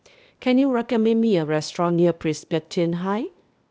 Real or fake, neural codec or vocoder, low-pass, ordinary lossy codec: fake; codec, 16 kHz, 0.8 kbps, ZipCodec; none; none